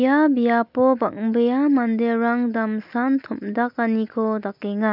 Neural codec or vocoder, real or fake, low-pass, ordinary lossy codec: none; real; 5.4 kHz; none